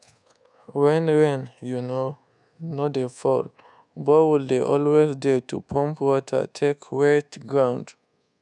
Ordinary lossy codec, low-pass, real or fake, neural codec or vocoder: none; 10.8 kHz; fake; codec, 24 kHz, 1.2 kbps, DualCodec